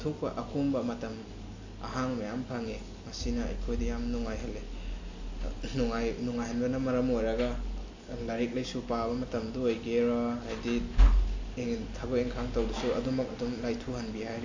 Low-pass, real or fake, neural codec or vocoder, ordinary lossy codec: 7.2 kHz; real; none; AAC, 48 kbps